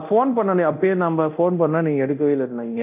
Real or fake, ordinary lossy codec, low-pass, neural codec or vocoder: fake; AAC, 32 kbps; 3.6 kHz; codec, 24 kHz, 0.9 kbps, DualCodec